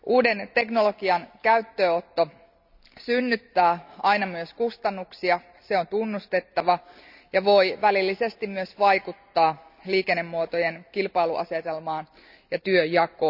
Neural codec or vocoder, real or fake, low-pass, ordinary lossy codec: none; real; 5.4 kHz; none